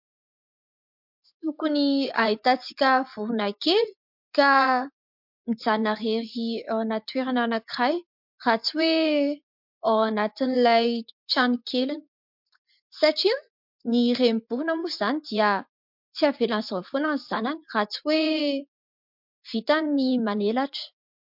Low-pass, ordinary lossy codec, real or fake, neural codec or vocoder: 5.4 kHz; MP3, 48 kbps; fake; vocoder, 24 kHz, 100 mel bands, Vocos